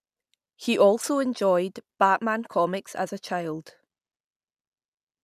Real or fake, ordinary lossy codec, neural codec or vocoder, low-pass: real; none; none; 14.4 kHz